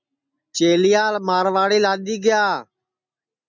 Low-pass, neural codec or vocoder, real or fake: 7.2 kHz; none; real